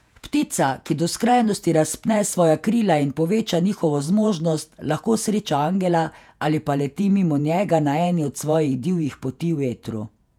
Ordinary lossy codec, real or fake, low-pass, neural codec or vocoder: none; fake; 19.8 kHz; vocoder, 48 kHz, 128 mel bands, Vocos